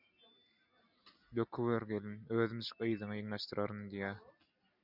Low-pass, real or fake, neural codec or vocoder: 5.4 kHz; real; none